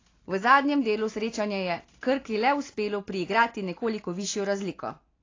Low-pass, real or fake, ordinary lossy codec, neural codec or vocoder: 7.2 kHz; real; AAC, 32 kbps; none